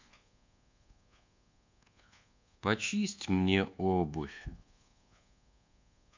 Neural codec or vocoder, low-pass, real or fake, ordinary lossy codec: codec, 24 kHz, 1.2 kbps, DualCodec; 7.2 kHz; fake; MP3, 64 kbps